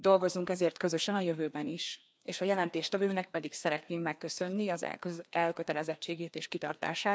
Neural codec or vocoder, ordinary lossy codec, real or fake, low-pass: codec, 16 kHz, 2 kbps, FreqCodec, larger model; none; fake; none